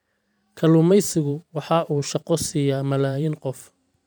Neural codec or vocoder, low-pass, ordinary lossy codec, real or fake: vocoder, 44.1 kHz, 128 mel bands every 512 samples, BigVGAN v2; none; none; fake